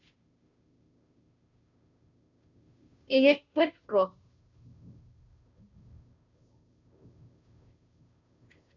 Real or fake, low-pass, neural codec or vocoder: fake; 7.2 kHz; codec, 16 kHz, 0.5 kbps, FunCodec, trained on Chinese and English, 25 frames a second